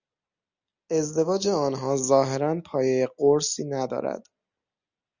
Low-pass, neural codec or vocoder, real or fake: 7.2 kHz; none; real